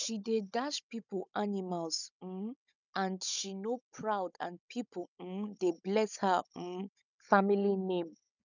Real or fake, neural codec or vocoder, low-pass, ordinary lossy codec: fake; codec, 16 kHz, 16 kbps, FunCodec, trained on Chinese and English, 50 frames a second; 7.2 kHz; none